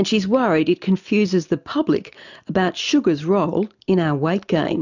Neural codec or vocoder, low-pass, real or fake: none; 7.2 kHz; real